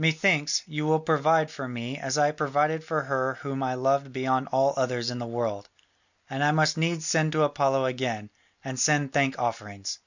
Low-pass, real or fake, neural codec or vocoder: 7.2 kHz; real; none